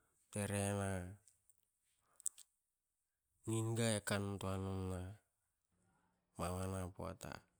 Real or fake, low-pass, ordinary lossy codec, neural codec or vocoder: real; none; none; none